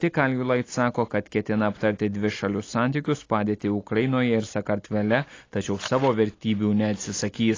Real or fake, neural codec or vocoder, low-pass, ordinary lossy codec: real; none; 7.2 kHz; AAC, 32 kbps